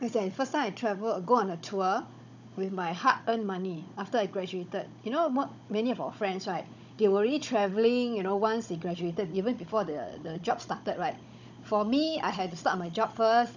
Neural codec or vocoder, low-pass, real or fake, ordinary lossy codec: codec, 16 kHz, 16 kbps, FunCodec, trained on Chinese and English, 50 frames a second; 7.2 kHz; fake; none